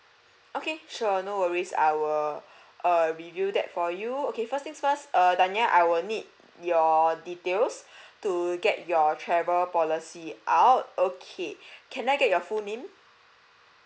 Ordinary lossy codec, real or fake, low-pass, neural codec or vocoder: none; real; none; none